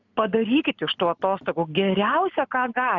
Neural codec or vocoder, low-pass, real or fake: none; 7.2 kHz; real